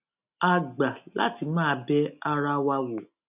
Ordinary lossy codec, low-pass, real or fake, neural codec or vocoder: none; 3.6 kHz; real; none